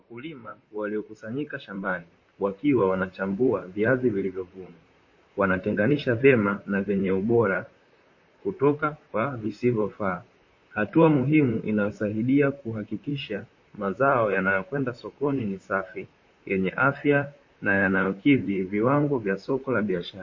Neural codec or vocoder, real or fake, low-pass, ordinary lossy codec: vocoder, 44.1 kHz, 128 mel bands, Pupu-Vocoder; fake; 7.2 kHz; MP3, 32 kbps